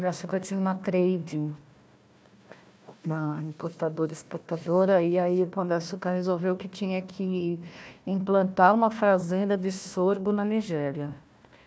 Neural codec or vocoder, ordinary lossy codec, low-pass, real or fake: codec, 16 kHz, 1 kbps, FunCodec, trained on Chinese and English, 50 frames a second; none; none; fake